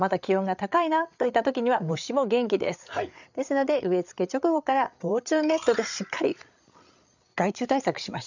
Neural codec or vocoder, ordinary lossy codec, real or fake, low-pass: codec, 16 kHz, 8 kbps, FreqCodec, larger model; none; fake; 7.2 kHz